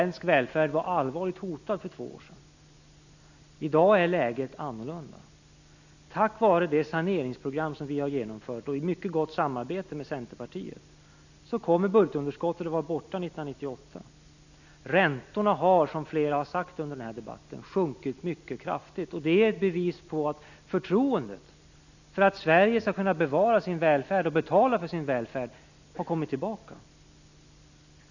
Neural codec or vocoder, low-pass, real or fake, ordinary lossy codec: none; 7.2 kHz; real; none